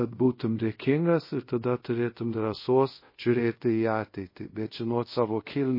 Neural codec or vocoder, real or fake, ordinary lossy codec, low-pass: codec, 24 kHz, 0.5 kbps, DualCodec; fake; MP3, 24 kbps; 5.4 kHz